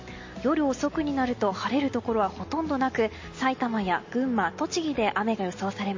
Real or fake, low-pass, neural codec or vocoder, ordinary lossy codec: real; 7.2 kHz; none; MP3, 32 kbps